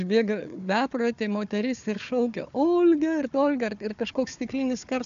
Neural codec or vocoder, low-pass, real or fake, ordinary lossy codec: codec, 16 kHz, 4 kbps, FunCodec, trained on Chinese and English, 50 frames a second; 7.2 kHz; fake; AAC, 96 kbps